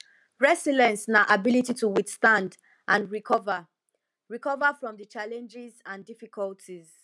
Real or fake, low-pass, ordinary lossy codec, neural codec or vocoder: real; none; none; none